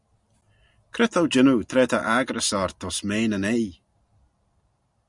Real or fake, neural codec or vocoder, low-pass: real; none; 10.8 kHz